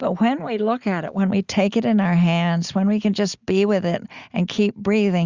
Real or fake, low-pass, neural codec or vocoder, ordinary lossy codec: real; 7.2 kHz; none; Opus, 64 kbps